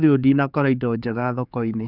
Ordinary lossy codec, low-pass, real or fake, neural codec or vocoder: none; 5.4 kHz; fake; codec, 16 kHz, 4 kbps, X-Codec, HuBERT features, trained on general audio